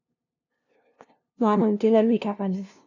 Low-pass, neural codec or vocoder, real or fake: 7.2 kHz; codec, 16 kHz, 0.5 kbps, FunCodec, trained on LibriTTS, 25 frames a second; fake